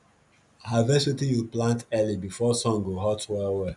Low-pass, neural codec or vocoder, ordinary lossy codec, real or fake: 10.8 kHz; none; none; real